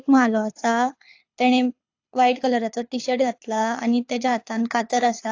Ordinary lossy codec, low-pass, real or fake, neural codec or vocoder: AAC, 48 kbps; 7.2 kHz; fake; codec, 24 kHz, 6 kbps, HILCodec